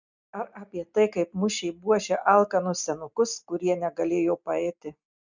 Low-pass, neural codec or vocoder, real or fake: 7.2 kHz; none; real